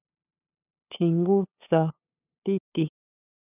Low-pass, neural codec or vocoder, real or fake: 3.6 kHz; codec, 16 kHz, 8 kbps, FunCodec, trained on LibriTTS, 25 frames a second; fake